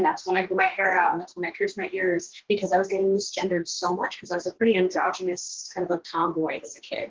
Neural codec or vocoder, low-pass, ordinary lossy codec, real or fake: codec, 44.1 kHz, 2.6 kbps, DAC; 7.2 kHz; Opus, 16 kbps; fake